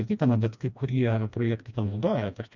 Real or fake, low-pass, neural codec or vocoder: fake; 7.2 kHz; codec, 16 kHz, 1 kbps, FreqCodec, smaller model